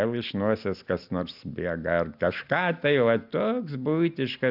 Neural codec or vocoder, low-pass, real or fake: none; 5.4 kHz; real